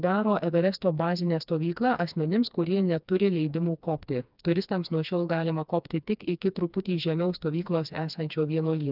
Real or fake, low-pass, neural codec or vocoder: fake; 5.4 kHz; codec, 16 kHz, 2 kbps, FreqCodec, smaller model